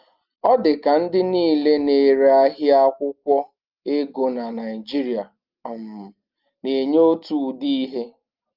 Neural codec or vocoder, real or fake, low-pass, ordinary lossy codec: none; real; 5.4 kHz; Opus, 32 kbps